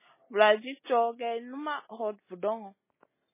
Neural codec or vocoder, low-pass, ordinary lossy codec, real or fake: none; 3.6 kHz; MP3, 16 kbps; real